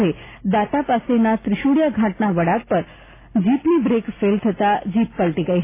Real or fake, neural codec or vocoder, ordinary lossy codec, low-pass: real; none; AAC, 24 kbps; 3.6 kHz